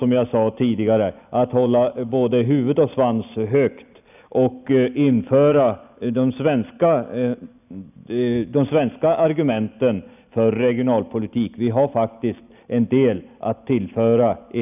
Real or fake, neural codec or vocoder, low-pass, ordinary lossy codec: real; none; 3.6 kHz; none